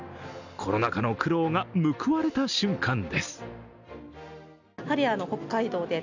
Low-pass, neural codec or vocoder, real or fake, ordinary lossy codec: 7.2 kHz; none; real; none